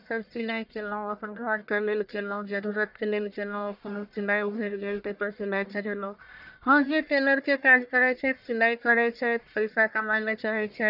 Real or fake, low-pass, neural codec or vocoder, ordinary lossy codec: fake; 5.4 kHz; codec, 44.1 kHz, 1.7 kbps, Pupu-Codec; none